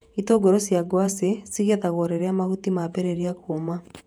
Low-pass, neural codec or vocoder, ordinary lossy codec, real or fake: 19.8 kHz; vocoder, 48 kHz, 128 mel bands, Vocos; none; fake